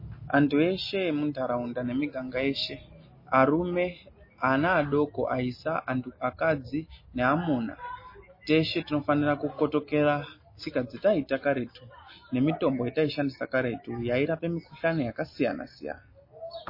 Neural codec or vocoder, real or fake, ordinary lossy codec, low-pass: none; real; MP3, 24 kbps; 5.4 kHz